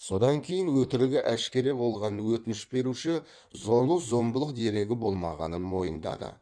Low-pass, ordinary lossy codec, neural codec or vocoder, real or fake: 9.9 kHz; none; codec, 16 kHz in and 24 kHz out, 1.1 kbps, FireRedTTS-2 codec; fake